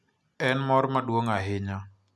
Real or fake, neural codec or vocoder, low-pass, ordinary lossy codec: real; none; none; none